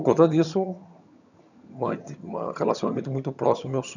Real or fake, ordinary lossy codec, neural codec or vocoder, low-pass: fake; none; vocoder, 22.05 kHz, 80 mel bands, HiFi-GAN; 7.2 kHz